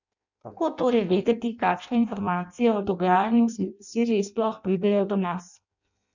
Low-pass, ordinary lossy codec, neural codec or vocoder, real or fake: 7.2 kHz; none; codec, 16 kHz in and 24 kHz out, 0.6 kbps, FireRedTTS-2 codec; fake